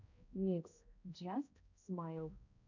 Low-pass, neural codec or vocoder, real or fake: 7.2 kHz; codec, 16 kHz, 0.5 kbps, X-Codec, HuBERT features, trained on balanced general audio; fake